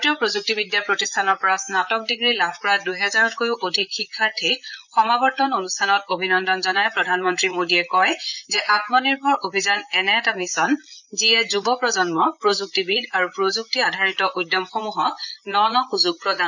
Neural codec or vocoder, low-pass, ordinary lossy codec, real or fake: vocoder, 44.1 kHz, 128 mel bands, Pupu-Vocoder; 7.2 kHz; none; fake